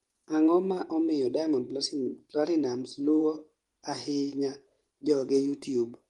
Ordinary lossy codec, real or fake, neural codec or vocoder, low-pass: Opus, 24 kbps; fake; vocoder, 24 kHz, 100 mel bands, Vocos; 10.8 kHz